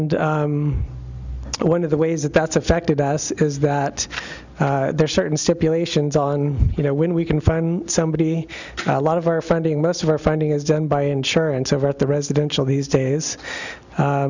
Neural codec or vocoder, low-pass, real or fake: none; 7.2 kHz; real